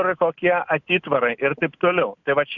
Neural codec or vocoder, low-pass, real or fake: none; 7.2 kHz; real